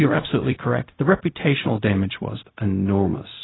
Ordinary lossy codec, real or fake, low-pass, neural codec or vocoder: AAC, 16 kbps; fake; 7.2 kHz; codec, 16 kHz, 0.4 kbps, LongCat-Audio-Codec